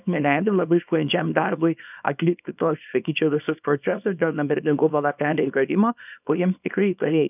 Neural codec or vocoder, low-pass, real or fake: codec, 24 kHz, 0.9 kbps, WavTokenizer, small release; 3.6 kHz; fake